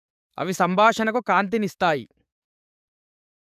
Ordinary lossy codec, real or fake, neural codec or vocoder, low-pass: none; fake; codec, 44.1 kHz, 7.8 kbps, DAC; 14.4 kHz